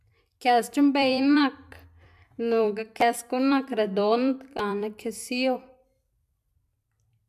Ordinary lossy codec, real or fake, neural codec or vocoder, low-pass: none; fake; vocoder, 44.1 kHz, 128 mel bands every 256 samples, BigVGAN v2; 14.4 kHz